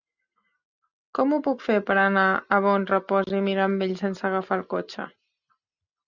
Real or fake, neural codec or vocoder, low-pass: real; none; 7.2 kHz